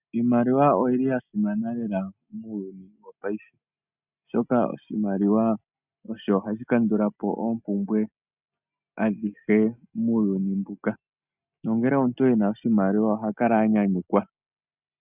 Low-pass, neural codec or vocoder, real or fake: 3.6 kHz; none; real